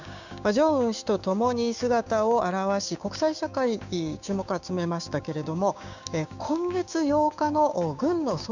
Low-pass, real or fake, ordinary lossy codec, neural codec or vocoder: 7.2 kHz; fake; none; codec, 16 kHz, 6 kbps, DAC